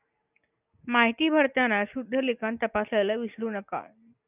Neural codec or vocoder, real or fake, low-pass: none; real; 3.6 kHz